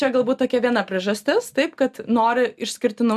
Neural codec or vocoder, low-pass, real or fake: none; 14.4 kHz; real